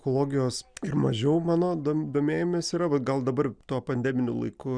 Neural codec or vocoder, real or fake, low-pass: none; real; 9.9 kHz